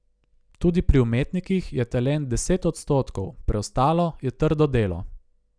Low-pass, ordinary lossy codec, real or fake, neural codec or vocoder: 9.9 kHz; none; real; none